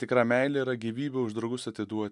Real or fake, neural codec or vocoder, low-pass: real; none; 10.8 kHz